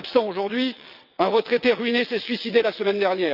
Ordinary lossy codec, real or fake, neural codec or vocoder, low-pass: Opus, 64 kbps; fake; vocoder, 22.05 kHz, 80 mel bands, WaveNeXt; 5.4 kHz